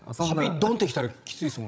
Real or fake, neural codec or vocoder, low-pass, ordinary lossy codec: fake; codec, 16 kHz, 16 kbps, FreqCodec, larger model; none; none